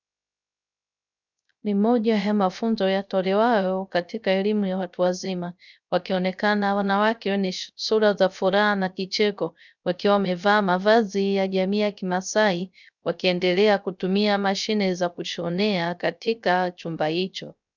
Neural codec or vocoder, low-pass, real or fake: codec, 16 kHz, 0.3 kbps, FocalCodec; 7.2 kHz; fake